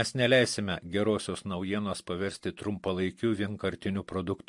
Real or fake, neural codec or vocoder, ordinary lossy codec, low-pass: real; none; MP3, 48 kbps; 10.8 kHz